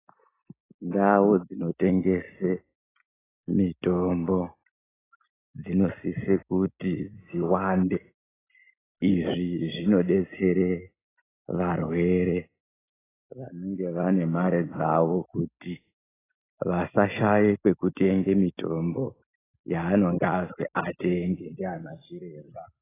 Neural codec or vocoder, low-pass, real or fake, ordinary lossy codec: none; 3.6 kHz; real; AAC, 16 kbps